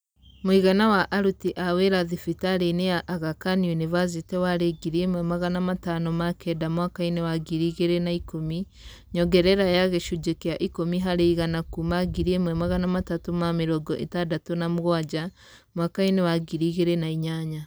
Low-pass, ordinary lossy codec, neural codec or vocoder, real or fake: none; none; none; real